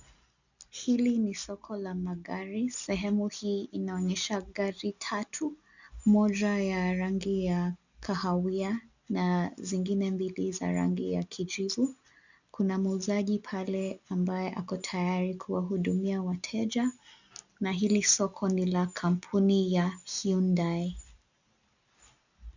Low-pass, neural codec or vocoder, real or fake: 7.2 kHz; none; real